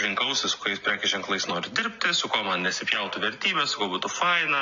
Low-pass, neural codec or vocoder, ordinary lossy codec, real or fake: 7.2 kHz; none; AAC, 64 kbps; real